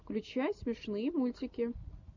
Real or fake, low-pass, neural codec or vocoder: real; 7.2 kHz; none